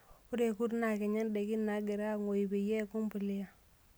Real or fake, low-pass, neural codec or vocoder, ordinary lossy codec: real; none; none; none